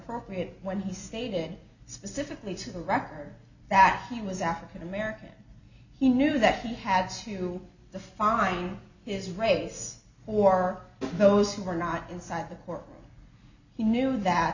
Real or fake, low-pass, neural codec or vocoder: real; 7.2 kHz; none